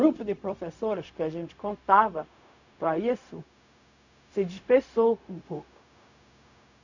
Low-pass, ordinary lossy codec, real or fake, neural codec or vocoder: 7.2 kHz; none; fake; codec, 16 kHz, 0.4 kbps, LongCat-Audio-Codec